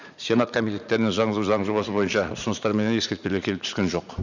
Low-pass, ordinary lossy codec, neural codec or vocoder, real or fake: 7.2 kHz; none; vocoder, 44.1 kHz, 80 mel bands, Vocos; fake